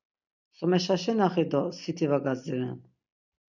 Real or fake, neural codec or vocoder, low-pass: real; none; 7.2 kHz